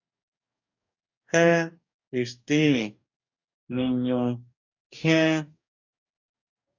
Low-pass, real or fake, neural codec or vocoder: 7.2 kHz; fake; codec, 44.1 kHz, 2.6 kbps, DAC